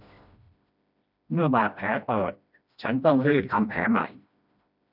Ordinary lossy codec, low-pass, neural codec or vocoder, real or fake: none; 5.4 kHz; codec, 16 kHz, 1 kbps, FreqCodec, smaller model; fake